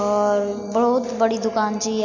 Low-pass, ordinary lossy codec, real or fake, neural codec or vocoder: 7.2 kHz; none; real; none